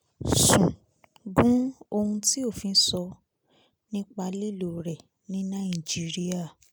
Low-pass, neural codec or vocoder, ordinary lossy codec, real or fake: none; none; none; real